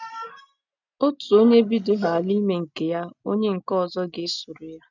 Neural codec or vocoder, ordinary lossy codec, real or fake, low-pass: none; none; real; 7.2 kHz